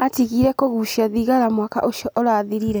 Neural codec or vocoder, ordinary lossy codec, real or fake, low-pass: none; none; real; none